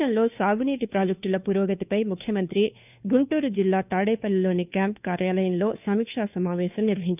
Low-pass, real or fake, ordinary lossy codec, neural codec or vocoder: 3.6 kHz; fake; none; codec, 16 kHz, 2 kbps, FunCodec, trained on Chinese and English, 25 frames a second